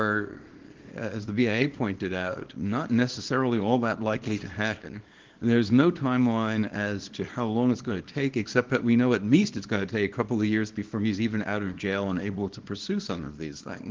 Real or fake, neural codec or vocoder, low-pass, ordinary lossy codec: fake; codec, 24 kHz, 0.9 kbps, WavTokenizer, small release; 7.2 kHz; Opus, 16 kbps